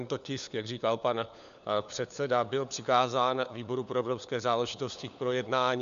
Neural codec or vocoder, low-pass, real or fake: codec, 16 kHz, 4 kbps, FunCodec, trained on LibriTTS, 50 frames a second; 7.2 kHz; fake